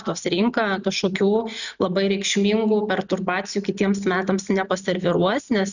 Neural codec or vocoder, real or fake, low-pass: none; real; 7.2 kHz